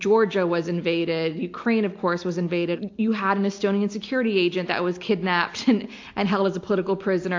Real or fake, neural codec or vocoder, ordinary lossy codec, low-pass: real; none; AAC, 48 kbps; 7.2 kHz